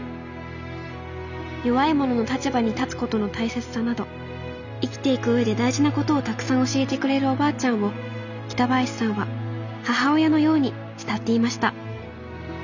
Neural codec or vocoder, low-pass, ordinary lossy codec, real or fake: none; 7.2 kHz; none; real